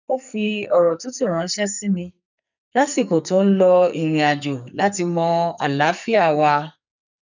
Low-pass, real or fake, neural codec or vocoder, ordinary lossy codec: 7.2 kHz; fake; codec, 44.1 kHz, 2.6 kbps, SNAC; none